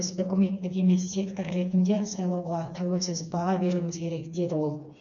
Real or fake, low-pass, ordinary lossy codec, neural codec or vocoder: fake; 7.2 kHz; none; codec, 16 kHz, 2 kbps, FreqCodec, smaller model